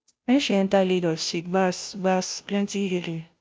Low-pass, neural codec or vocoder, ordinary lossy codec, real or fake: none; codec, 16 kHz, 0.5 kbps, FunCodec, trained on Chinese and English, 25 frames a second; none; fake